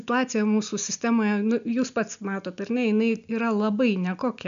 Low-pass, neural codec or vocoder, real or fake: 7.2 kHz; none; real